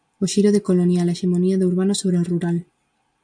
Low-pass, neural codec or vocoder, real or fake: 9.9 kHz; none; real